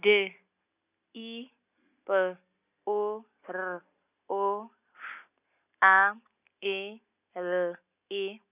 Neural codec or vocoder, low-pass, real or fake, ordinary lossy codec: none; 3.6 kHz; real; none